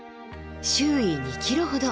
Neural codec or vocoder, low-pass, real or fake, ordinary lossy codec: none; none; real; none